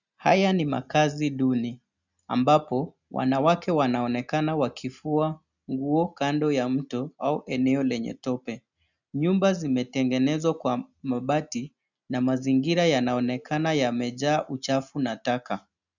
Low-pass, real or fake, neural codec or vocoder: 7.2 kHz; real; none